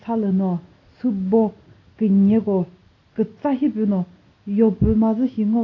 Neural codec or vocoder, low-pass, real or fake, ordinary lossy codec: none; 7.2 kHz; real; AAC, 32 kbps